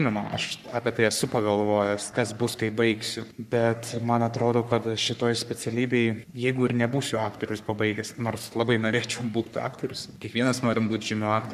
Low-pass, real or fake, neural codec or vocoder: 14.4 kHz; fake; codec, 44.1 kHz, 3.4 kbps, Pupu-Codec